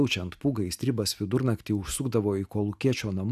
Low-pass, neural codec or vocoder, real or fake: 14.4 kHz; none; real